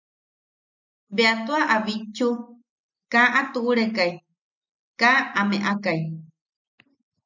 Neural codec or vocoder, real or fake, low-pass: none; real; 7.2 kHz